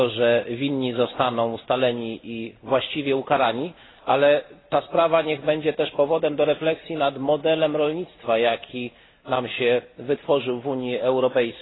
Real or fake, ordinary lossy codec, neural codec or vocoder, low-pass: real; AAC, 16 kbps; none; 7.2 kHz